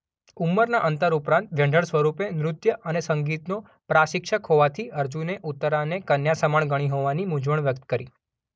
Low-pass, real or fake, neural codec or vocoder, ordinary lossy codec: none; real; none; none